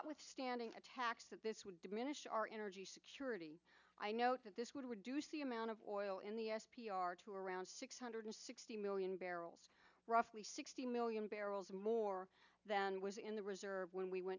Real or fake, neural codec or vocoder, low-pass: real; none; 7.2 kHz